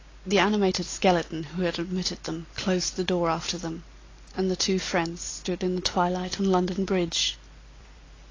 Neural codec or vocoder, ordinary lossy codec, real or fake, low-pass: none; AAC, 32 kbps; real; 7.2 kHz